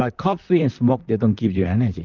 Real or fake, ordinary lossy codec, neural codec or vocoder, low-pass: fake; Opus, 24 kbps; vocoder, 44.1 kHz, 128 mel bands, Pupu-Vocoder; 7.2 kHz